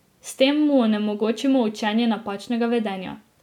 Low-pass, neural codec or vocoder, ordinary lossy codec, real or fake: 19.8 kHz; none; none; real